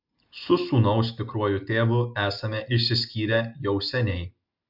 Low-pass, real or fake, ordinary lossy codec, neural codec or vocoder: 5.4 kHz; real; MP3, 48 kbps; none